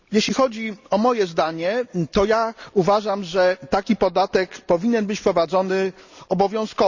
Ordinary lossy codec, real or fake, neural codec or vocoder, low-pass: Opus, 64 kbps; real; none; 7.2 kHz